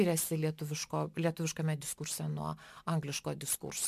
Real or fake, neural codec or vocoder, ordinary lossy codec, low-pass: real; none; MP3, 96 kbps; 14.4 kHz